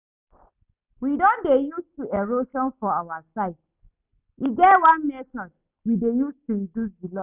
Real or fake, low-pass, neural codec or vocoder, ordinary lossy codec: real; 3.6 kHz; none; none